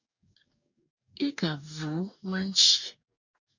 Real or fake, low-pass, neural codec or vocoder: fake; 7.2 kHz; codec, 44.1 kHz, 2.6 kbps, DAC